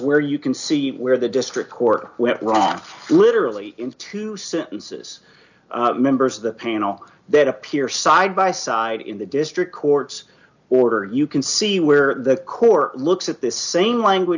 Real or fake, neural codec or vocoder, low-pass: real; none; 7.2 kHz